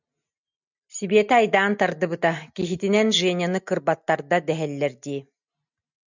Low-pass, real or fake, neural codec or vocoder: 7.2 kHz; real; none